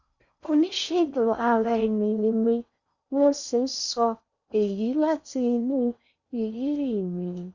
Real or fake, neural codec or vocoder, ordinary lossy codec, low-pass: fake; codec, 16 kHz in and 24 kHz out, 0.6 kbps, FocalCodec, streaming, 4096 codes; Opus, 64 kbps; 7.2 kHz